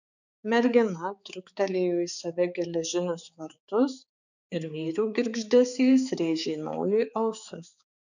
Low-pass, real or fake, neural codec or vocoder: 7.2 kHz; fake; codec, 16 kHz, 4 kbps, X-Codec, HuBERT features, trained on balanced general audio